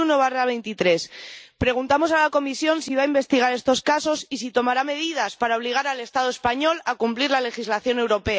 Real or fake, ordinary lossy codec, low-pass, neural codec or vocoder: real; none; none; none